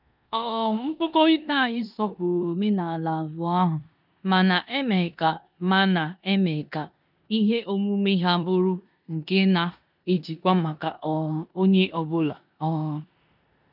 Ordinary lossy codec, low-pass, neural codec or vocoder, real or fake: none; 5.4 kHz; codec, 16 kHz in and 24 kHz out, 0.9 kbps, LongCat-Audio-Codec, four codebook decoder; fake